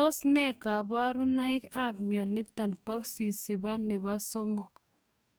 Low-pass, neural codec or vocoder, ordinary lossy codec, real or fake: none; codec, 44.1 kHz, 2.6 kbps, DAC; none; fake